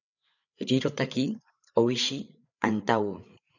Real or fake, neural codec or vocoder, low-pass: fake; codec, 16 kHz, 8 kbps, FreqCodec, larger model; 7.2 kHz